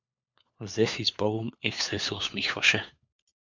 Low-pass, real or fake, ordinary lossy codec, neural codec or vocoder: 7.2 kHz; fake; MP3, 64 kbps; codec, 16 kHz, 4 kbps, FunCodec, trained on LibriTTS, 50 frames a second